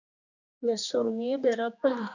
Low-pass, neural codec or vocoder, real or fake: 7.2 kHz; codec, 16 kHz, 2 kbps, X-Codec, HuBERT features, trained on general audio; fake